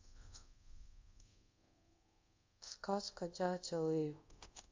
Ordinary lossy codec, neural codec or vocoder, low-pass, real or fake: MP3, 64 kbps; codec, 24 kHz, 0.5 kbps, DualCodec; 7.2 kHz; fake